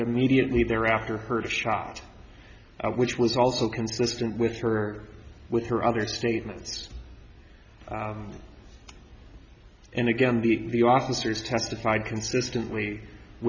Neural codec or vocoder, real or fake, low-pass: none; real; 7.2 kHz